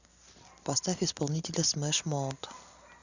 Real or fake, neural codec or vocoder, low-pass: real; none; 7.2 kHz